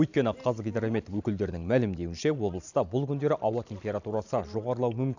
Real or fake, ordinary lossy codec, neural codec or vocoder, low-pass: real; none; none; 7.2 kHz